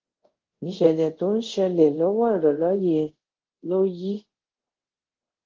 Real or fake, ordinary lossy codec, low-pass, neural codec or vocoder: fake; Opus, 16 kbps; 7.2 kHz; codec, 24 kHz, 0.5 kbps, DualCodec